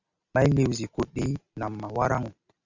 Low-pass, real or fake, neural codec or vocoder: 7.2 kHz; real; none